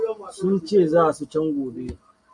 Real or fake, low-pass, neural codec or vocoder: real; 10.8 kHz; none